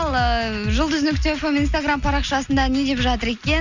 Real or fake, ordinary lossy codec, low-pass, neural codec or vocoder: real; none; 7.2 kHz; none